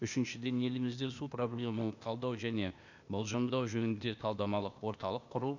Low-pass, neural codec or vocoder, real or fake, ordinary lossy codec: 7.2 kHz; codec, 16 kHz, 0.8 kbps, ZipCodec; fake; none